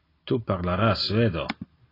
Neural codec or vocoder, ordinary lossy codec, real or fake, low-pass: none; AAC, 24 kbps; real; 5.4 kHz